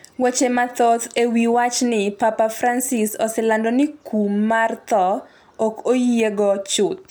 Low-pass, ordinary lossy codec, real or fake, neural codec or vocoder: none; none; real; none